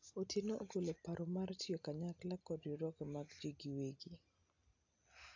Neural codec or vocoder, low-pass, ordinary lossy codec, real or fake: none; 7.2 kHz; AAC, 48 kbps; real